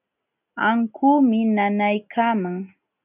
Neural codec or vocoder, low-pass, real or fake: none; 3.6 kHz; real